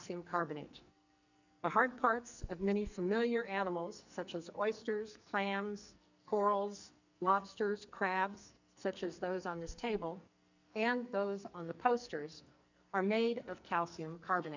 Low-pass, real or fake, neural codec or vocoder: 7.2 kHz; fake; codec, 44.1 kHz, 2.6 kbps, SNAC